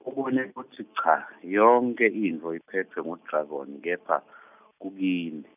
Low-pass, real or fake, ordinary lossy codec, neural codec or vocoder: 3.6 kHz; real; none; none